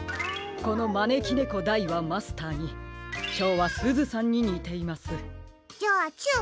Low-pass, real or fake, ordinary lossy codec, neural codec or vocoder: none; real; none; none